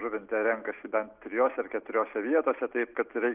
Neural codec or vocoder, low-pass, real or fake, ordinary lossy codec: none; 3.6 kHz; real; Opus, 24 kbps